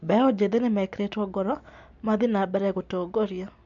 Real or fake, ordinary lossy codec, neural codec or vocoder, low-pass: real; MP3, 64 kbps; none; 7.2 kHz